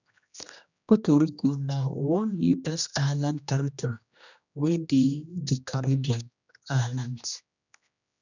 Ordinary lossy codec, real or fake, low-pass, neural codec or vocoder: none; fake; 7.2 kHz; codec, 16 kHz, 1 kbps, X-Codec, HuBERT features, trained on general audio